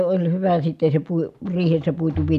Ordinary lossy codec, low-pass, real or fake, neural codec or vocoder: none; 14.4 kHz; real; none